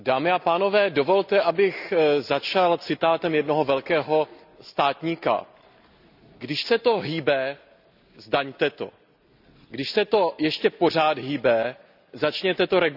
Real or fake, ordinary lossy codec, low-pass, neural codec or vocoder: real; none; 5.4 kHz; none